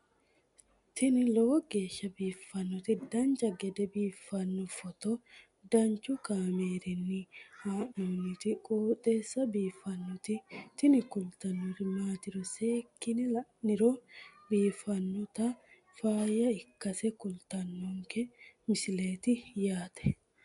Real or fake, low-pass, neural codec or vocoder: real; 10.8 kHz; none